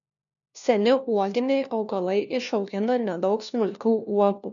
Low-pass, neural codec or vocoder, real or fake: 7.2 kHz; codec, 16 kHz, 1 kbps, FunCodec, trained on LibriTTS, 50 frames a second; fake